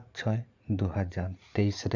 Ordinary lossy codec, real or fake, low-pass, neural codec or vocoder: none; fake; 7.2 kHz; vocoder, 44.1 kHz, 80 mel bands, Vocos